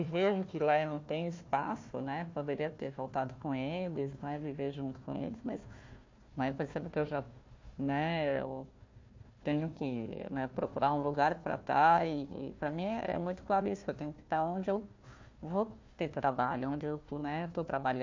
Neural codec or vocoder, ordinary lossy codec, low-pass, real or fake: codec, 16 kHz, 1 kbps, FunCodec, trained on Chinese and English, 50 frames a second; MP3, 48 kbps; 7.2 kHz; fake